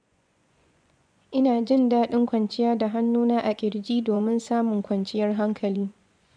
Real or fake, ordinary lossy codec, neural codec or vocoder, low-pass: fake; none; vocoder, 24 kHz, 100 mel bands, Vocos; 9.9 kHz